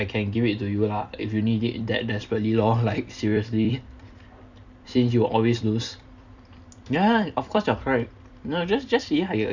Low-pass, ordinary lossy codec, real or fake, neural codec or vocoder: 7.2 kHz; none; fake; vocoder, 44.1 kHz, 128 mel bands every 256 samples, BigVGAN v2